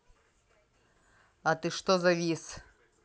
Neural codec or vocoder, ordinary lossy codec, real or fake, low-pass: none; none; real; none